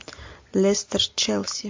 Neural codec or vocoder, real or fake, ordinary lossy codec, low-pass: none; real; MP3, 48 kbps; 7.2 kHz